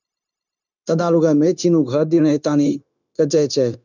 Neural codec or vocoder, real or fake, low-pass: codec, 16 kHz, 0.9 kbps, LongCat-Audio-Codec; fake; 7.2 kHz